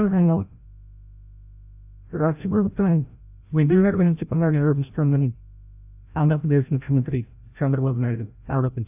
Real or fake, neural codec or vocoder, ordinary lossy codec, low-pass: fake; codec, 16 kHz, 0.5 kbps, FreqCodec, larger model; AAC, 32 kbps; 3.6 kHz